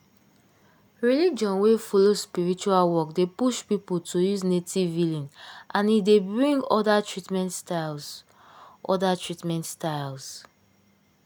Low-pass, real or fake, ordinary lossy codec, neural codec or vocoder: none; real; none; none